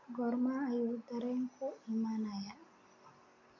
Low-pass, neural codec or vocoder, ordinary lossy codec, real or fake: 7.2 kHz; none; MP3, 48 kbps; real